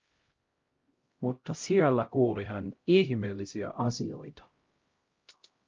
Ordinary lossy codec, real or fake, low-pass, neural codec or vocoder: Opus, 24 kbps; fake; 7.2 kHz; codec, 16 kHz, 0.5 kbps, X-Codec, HuBERT features, trained on LibriSpeech